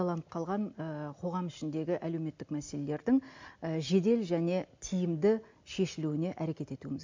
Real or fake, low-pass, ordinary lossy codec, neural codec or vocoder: real; 7.2 kHz; MP3, 64 kbps; none